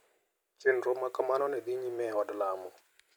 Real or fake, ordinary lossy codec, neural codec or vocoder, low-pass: real; none; none; none